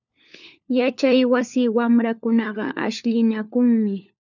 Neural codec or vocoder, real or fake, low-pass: codec, 16 kHz, 4 kbps, FunCodec, trained on LibriTTS, 50 frames a second; fake; 7.2 kHz